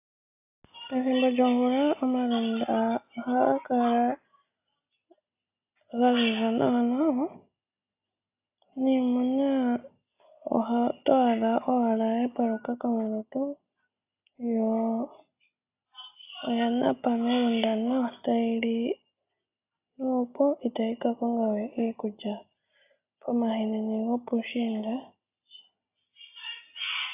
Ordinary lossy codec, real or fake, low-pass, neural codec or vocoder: AAC, 32 kbps; real; 3.6 kHz; none